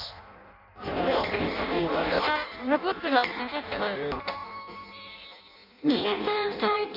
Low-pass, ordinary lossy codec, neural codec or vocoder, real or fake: 5.4 kHz; AAC, 48 kbps; codec, 16 kHz in and 24 kHz out, 0.6 kbps, FireRedTTS-2 codec; fake